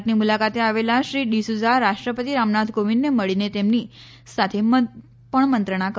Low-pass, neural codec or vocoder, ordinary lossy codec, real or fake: none; none; none; real